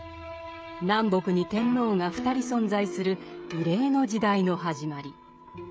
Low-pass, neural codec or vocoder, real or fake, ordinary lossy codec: none; codec, 16 kHz, 16 kbps, FreqCodec, smaller model; fake; none